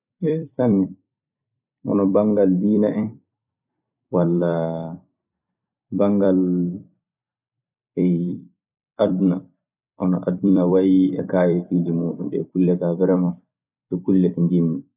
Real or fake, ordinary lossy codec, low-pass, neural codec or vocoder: fake; none; 3.6 kHz; vocoder, 44.1 kHz, 128 mel bands every 256 samples, BigVGAN v2